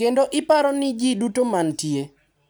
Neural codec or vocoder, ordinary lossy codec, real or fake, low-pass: none; none; real; none